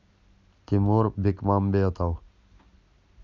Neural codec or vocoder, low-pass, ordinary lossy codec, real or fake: vocoder, 44.1 kHz, 128 mel bands every 256 samples, BigVGAN v2; 7.2 kHz; none; fake